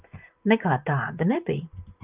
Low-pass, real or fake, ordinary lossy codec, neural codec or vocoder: 3.6 kHz; fake; Opus, 24 kbps; vocoder, 44.1 kHz, 128 mel bands, Pupu-Vocoder